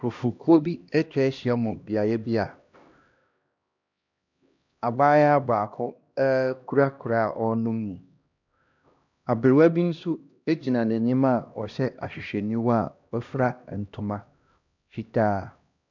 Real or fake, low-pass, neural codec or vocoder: fake; 7.2 kHz; codec, 16 kHz, 1 kbps, X-Codec, HuBERT features, trained on LibriSpeech